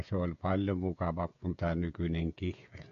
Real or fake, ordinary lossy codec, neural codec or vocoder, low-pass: fake; AAC, 48 kbps; codec, 16 kHz, 16 kbps, FreqCodec, smaller model; 7.2 kHz